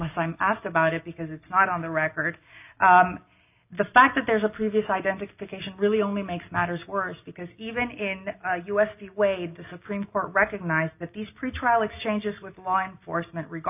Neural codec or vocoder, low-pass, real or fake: none; 3.6 kHz; real